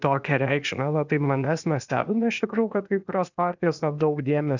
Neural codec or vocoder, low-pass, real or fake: codec, 16 kHz, 0.8 kbps, ZipCodec; 7.2 kHz; fake